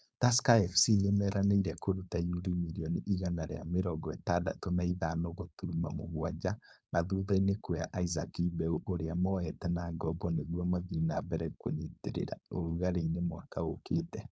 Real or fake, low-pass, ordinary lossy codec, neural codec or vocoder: fake; none; none; codec, 16 kHz, 4.8 kbps, FACodec